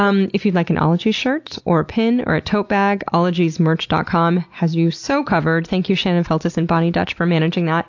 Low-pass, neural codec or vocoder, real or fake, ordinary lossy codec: 7.2 kHz; none; real; AAC, 48 kbps